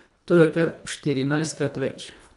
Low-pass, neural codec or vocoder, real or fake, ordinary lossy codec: 10.8 kHz; codec, 24 kHz, 1.5 kbps, HILCodec; fake; none